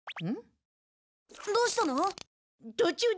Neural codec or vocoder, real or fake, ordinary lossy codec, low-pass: none; real; none; none